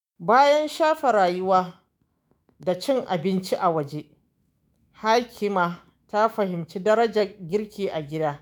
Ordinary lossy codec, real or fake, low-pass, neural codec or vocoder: none; fake; none; autoencoder, 48 kHz, 128 numbers a frame, DAC-VAE, trained on Japanese speech